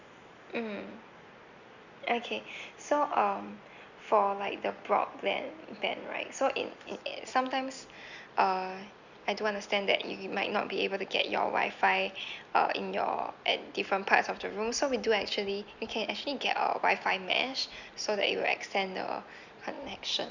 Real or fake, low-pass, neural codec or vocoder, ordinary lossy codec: real; 7.2 kHz; none; Opus, 64 kbps